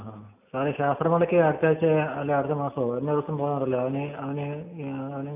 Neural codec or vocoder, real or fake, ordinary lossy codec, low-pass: none; real; none; 3.6 kHz